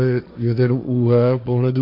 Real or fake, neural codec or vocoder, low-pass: fake; codec, 16 kHz, 1.1 kbps, Voila-Tokenizer; 5.4 kHz